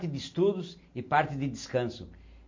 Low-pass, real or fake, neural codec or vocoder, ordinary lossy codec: 7.2 kHz; real; none; MP3, 48 kbps